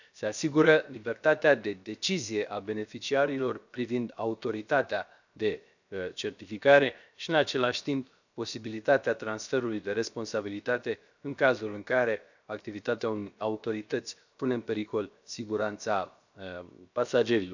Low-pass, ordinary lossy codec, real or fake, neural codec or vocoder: 7.2 kHz; none; fake; codec, 16 kHz, 0.7 kbps, FocalCodec